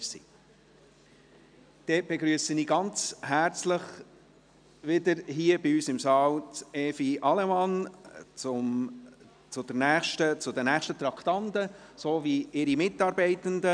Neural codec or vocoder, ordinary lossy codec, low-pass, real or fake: none; none; 9.9 kHz; real